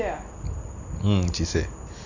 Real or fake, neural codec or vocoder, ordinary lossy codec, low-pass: real; none; none; 7.2 kHz